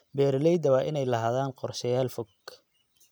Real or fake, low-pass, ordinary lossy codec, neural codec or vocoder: real; none; none; none